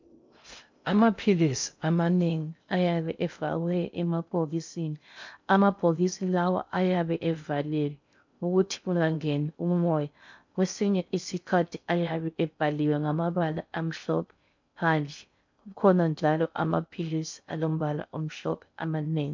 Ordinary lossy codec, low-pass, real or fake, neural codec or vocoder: MP3, 64 kbps; 7.2 kHz; fake; codec, 16 kHz in and 24 kHz out, 0.6 kbps, FocalCodec, streaming, 2048 codes